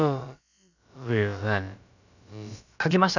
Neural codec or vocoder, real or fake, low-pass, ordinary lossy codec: codec, 16 kHz, about 1 kbps, DyCAST, with the encoder's durations; fake; 7.2 kHz; none